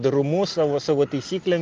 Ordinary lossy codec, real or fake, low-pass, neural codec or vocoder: Opus, 24 kbps; real; 7.2 kHz; none